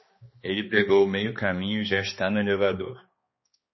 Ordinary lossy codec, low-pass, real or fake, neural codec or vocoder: MP3, 24 kbps; 7.2 kHz; fake; codec, 16 kHz, 2 kbps, X-Codec, HuBERT features, trained on balanced general audio